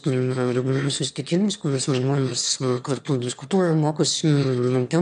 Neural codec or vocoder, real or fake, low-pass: autoencoder, 22.05 kHz, a latent of 192 numbers a frame, VITS, trained on one speaker; fake; 9.9 kHz